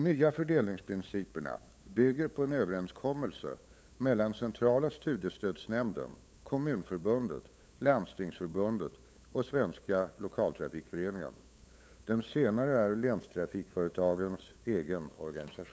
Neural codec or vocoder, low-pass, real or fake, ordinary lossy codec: codec, 16 kHz, 8 kbps, FunCodec, trained on LibriTTS, 25 frames a second; none; fake; none